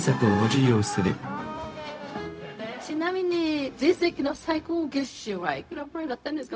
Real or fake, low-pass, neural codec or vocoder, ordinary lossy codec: fake; none; codec, 16 kHz, 0.4 kbps, LongCat-Audio-Codec; none